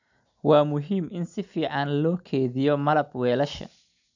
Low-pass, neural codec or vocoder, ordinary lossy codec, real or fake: 7.2 kHz; none; none; real